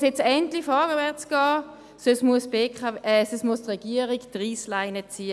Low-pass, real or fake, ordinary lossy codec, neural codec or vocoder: none; real; none; none